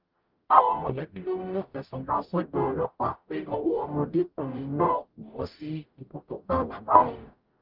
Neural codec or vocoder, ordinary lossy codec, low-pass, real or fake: codec, 44.1 kHz, 0.9 kbps, DAC; Opus, 32 kbps; 5.4 kHz; fake